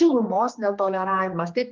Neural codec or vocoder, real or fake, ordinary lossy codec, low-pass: codec, 16 kHz, 2 kbps, X-Codec, HuBERT features, trained on general audio; fake; Opus, 24 kbps; 7.2 kHz